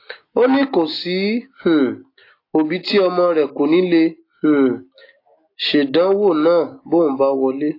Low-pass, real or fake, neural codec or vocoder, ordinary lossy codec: 5.4 kHz; real; none; AAC, 32 kbps